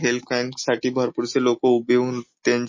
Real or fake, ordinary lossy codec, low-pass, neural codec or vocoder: real; MP3, 32 kbps; 7.2 kHz; none